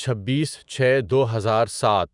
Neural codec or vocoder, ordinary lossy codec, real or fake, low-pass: autoencoder, 48 kHz, 128 numbers a frame, DAC-VAE, trained on Japanese speech; Opus, 64 kbps; fake; 10.8 kHz